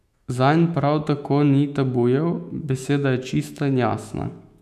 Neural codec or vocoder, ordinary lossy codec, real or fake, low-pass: autoencoder, 48 kHz, 128 numbers a frame, DAC-VAE, trained on Japanese speech; none; fake; 14.4 kHz